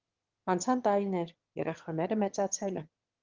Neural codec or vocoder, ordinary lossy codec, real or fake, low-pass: autoencoder, 22.05 kHz, a latent of 192 numbers a frame, VITS, trained on one speaker; Opus, 16 kbps; fake; 7.2 kHz